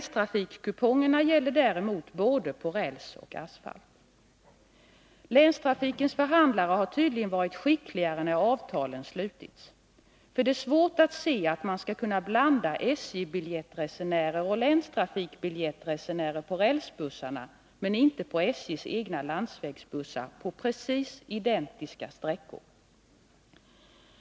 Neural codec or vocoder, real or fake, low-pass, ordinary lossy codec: none; real; none; none